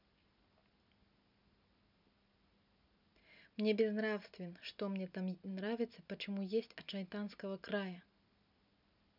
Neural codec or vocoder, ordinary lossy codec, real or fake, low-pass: none; none; real; 5.4 kHz